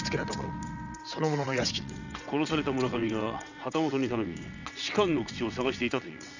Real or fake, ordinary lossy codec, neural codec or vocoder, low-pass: fake; none; vocoder, 22.05 kHz, 80 mel bands, WaveNeXt; 7.2 kHz